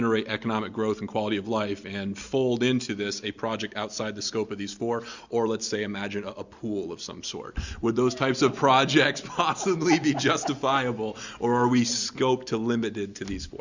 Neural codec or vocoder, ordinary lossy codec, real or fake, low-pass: none; Opus, 64 kbps; real; 7.2 kHz